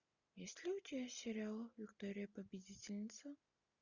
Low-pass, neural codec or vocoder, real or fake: 7.2 kHz; none; real